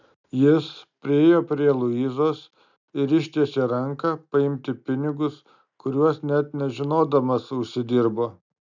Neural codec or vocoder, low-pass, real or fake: none; 7.2 kHz; real